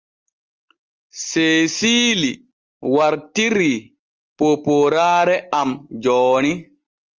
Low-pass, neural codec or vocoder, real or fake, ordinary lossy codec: 7.2 kHz; none; real; Opus, 24 kbps